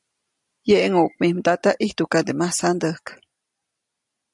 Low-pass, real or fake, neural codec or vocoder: 10.8 kHz; real; none